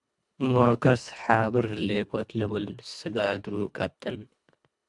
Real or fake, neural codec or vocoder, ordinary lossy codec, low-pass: fake; codec, 24 kHz, 1.5 kbps, HILCodec; MP3, 96 kbps; 10.8 kHz